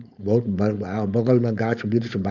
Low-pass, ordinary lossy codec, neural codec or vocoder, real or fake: 7.2 kHz; none; codec, 16 kHz, 4.8 kbps, FACodec; fake